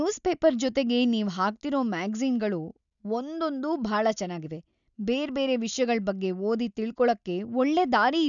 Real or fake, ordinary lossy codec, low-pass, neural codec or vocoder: real; none; 7.2 kHz; none